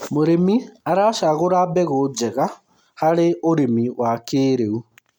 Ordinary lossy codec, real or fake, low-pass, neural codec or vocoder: MP3, 96 kbps; real; 19.8 kHz; none